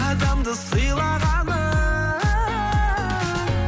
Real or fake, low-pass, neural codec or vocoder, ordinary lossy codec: real; none; none; none